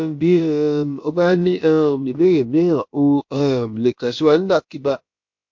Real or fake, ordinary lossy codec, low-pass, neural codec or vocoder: fake; MP3, 48 kbps; 7.2 kHz; codec, 16 kHz, about 1 kbps, DyCAST, with the encoder's durations